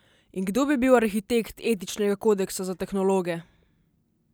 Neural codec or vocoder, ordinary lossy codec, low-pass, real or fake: none; none; none; real